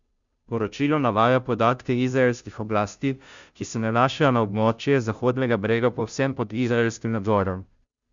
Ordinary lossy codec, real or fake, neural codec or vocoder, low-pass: Opus, 64 kbps; fake; codec, 16 kHz, 0.5 kbps, FunCodec, trained on Chinese and English, 25 frames a second; 7.2 kHz